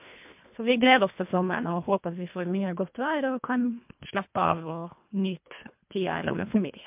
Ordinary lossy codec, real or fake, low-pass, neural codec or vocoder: MP3, 32 kbps; fake; 3.6 kHz; codec, 24 kHz, 1.5 kbps, HILCodec